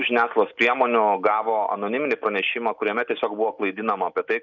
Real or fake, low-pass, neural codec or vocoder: real; 7.2 kHz; none